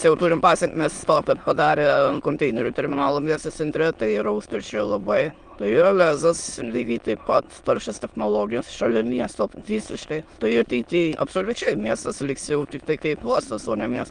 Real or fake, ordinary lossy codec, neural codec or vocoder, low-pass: fake; Opus, 32 kbps; autoencoder, 22.05 kHz, a latent of 192 numbers a frame, VITS, trained on many speakers; 9.9 kHz